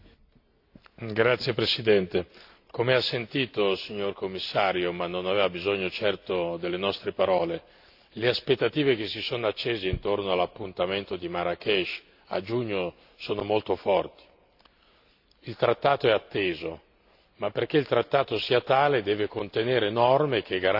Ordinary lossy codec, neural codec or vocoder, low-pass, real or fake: AAC, 48 kbps; none; 5.4 kHz; real